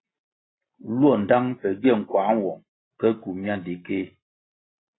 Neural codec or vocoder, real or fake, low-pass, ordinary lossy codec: none; real; 7.2 kHz; AAC, 16 kbps